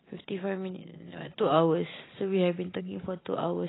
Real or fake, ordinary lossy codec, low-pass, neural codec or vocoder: real; AAC, 16 kbps; 7.2 kHz; none